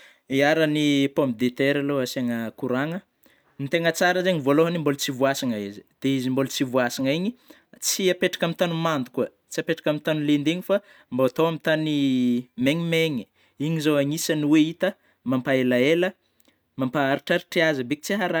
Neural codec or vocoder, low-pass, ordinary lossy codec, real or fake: none; none; none; real